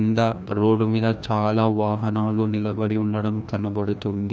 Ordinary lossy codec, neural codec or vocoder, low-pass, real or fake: none; codec, 16 kHz, 1 kbps, FreqCodec, larger model; none; fake